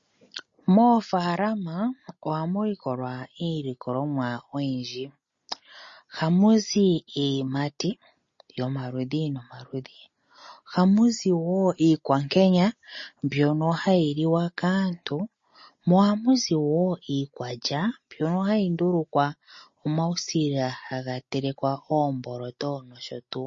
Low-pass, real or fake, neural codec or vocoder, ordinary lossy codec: 7.2 kHz; real; none; MP3, 32 kbps